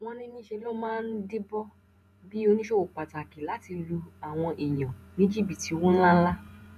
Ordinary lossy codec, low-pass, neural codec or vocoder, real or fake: none; 14.4 kHz; vocoder, 48 kHz, 128 mel bands, Vocos; fake